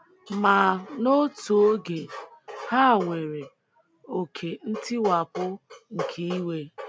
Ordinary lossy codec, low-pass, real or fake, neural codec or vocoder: none; none; real; none